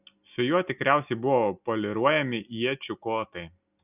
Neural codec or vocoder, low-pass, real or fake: none; 3.6 kHz; real